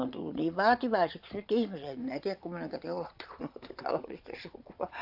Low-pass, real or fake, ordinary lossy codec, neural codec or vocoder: 5.4 kHz; fake; AAC, 48 kbps; vocoder, 22.05 kHz, 80 mel bands, Vocos